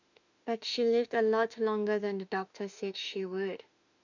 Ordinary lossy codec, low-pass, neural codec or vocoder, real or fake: none; 7.2 kHz; autoencoder, 48 kHz, 32 numbers a frame, DAC-VAE, trained on Japanese speech; fake